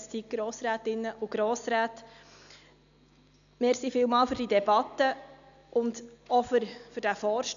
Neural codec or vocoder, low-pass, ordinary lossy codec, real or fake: none; 7.2 kHz; none; real